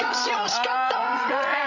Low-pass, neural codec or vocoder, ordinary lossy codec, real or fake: 7.2 kHz; codec, 16 kHz, 16 kbps, FreqCodec, larger model; none; fake